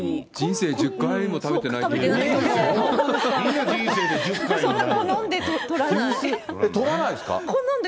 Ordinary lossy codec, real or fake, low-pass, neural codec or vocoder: none; real; none; none